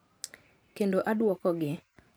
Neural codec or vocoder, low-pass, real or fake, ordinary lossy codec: vocoder, 44.1 kHz, 128 mel bands every 512 samples, BigVGAN v2; none; fake; none